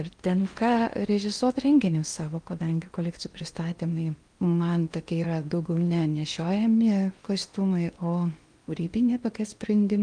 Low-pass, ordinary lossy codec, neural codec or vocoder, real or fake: 9.9 kHz; Opus, 32 kbps; codec, 16 kHz in and 24 kHz out, 0.8 kbps, FocalCodec, streaming, 65536 codes; fake